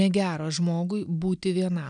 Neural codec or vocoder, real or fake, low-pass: none; real; 9.9 kHz